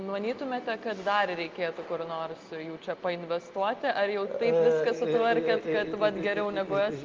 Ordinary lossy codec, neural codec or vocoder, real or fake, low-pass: Opus, 32 kbps; none; real; 7.2 kHz